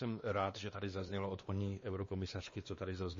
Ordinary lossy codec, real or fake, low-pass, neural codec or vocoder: MP3, 32 kbps; fake; 7.2 kHz; codec, 16 kHz, 2 kbps, X-Codec, WavLM features, trained on Multilingual LibriSpeech